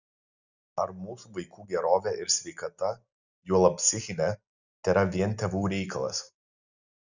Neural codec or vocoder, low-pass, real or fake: none; 7.2 kHz; real